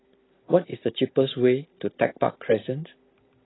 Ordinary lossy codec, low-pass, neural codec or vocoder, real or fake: AAC, 16 kbps; 7.2 kHz; none; real